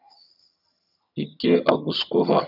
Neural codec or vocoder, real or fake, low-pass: vocoder, 22.05 kHz, 80 mel bands, HiFi-GAN; fake; 5.4 kHz